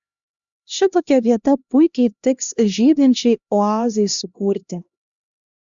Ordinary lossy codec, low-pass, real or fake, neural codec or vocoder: Opus, 64 kbps; 7.2 kHz; fake; codec, 16 kHz, 1 kbps, X-Codec, HuBERT features, trained on LibriSpeech